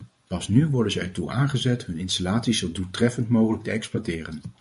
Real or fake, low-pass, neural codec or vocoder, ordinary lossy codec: fake; 10.8 kHz; vocoder, 24 kHz, 100 mel bands, Vocos; MP3, 48 kbps